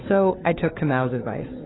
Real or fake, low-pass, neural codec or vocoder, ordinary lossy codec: fake; 7.2 kHz; codec, 16 kHz, 4 kbps, FunCodec, trained on LibriTTS, 50 frames a second; AAC, 16 kbps